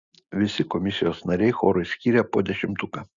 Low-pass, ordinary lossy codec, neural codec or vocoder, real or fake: 7.2 kHz; Opus, 64 kbps; none; real